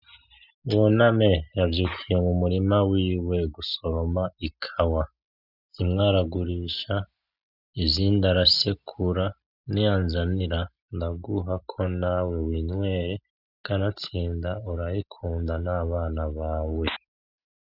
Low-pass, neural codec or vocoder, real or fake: 5.4 kHz; none; real